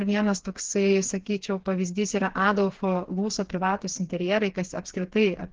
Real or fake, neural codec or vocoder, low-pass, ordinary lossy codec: fake; codec, 16 kHz, 4 kbps, FreqCodec, smaller model; 7.2 kHz; Opus, 16 kbps